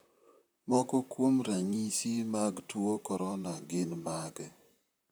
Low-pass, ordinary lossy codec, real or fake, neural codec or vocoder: none; none; fake; vocoder, 44.1 kHz, 128 mel bands, Pupu-Vocoder